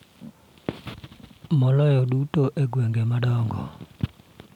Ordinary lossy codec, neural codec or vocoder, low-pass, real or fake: none; none; 19.8 kHz; real